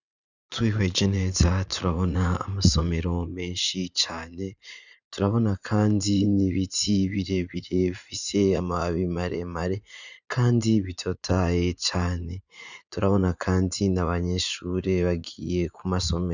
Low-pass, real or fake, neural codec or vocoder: 7.2 kHz; fake; vocoder, 44.1 kHz, 80 mel bands, Vocos